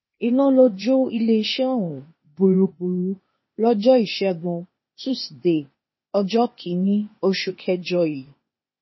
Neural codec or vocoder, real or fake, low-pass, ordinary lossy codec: codec, 16 kHz, 0.8 kbps, ZipCodec; fake; 7.2 kHz; MP3, 24 kbps